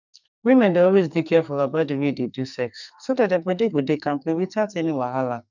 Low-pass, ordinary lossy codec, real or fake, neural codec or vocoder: 7.2 kHz; none; fake; codec, 44.1 kHz, 2.6 kbps, SNAC